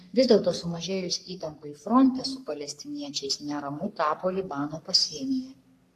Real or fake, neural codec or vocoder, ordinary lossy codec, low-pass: fake; codec, 44.1 kHz, 3.4 kbps, Pupu-Codec; AAC, 64 kbps; 14.4 kHz